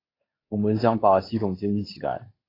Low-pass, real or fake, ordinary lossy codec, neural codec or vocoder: 5.4 kHz; fake; AAC, 24 kbps; codec, 24 kHz, 0.9 kbps, WavTokenizer, medium speech release version 2